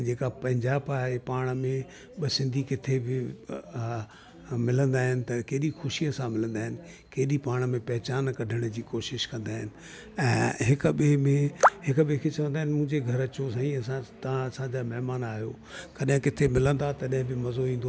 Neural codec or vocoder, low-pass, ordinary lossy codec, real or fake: none; none; none; real